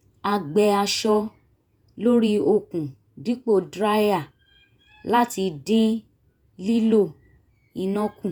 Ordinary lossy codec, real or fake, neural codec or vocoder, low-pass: none; fake; vocoder, 48 kHz, 128 mel bands, Vocos; none